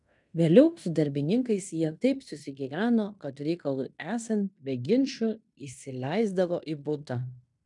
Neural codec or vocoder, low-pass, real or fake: codec, 16 kHz in and 24 kHz out, 0.9 kbps, LongCat-Audio-Codec, fine tuned four codebook decoder; 10.8 kHz; fake